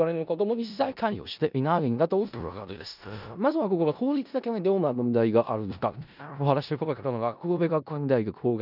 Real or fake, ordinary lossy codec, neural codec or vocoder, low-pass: fake; none; codec, 16 kHz in and 24 kHz out, 0.4 kbps, LongCat-Audio-Codec, four codebook decoder; 5.4 kHz